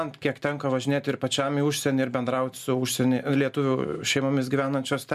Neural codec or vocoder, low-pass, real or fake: none; 14.4 kHz; real